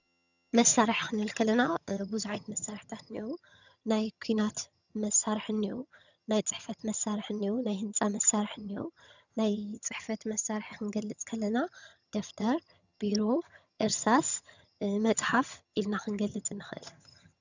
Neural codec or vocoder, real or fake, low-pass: vocoder, 22.05 kHz, 80 mel bands, HiFi-GAN; fake; 7.2 kHz